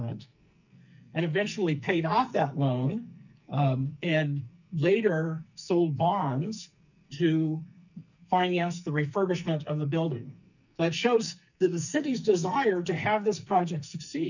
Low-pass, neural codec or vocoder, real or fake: 7.2 kHz; codec, 44.1 kHz, 2.6 kbps, SNAC; fake